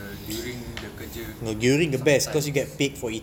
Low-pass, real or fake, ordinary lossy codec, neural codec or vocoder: 19.8 kHz; real; none; none